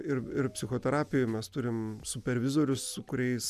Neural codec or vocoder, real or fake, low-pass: none; real; 14.4 kHz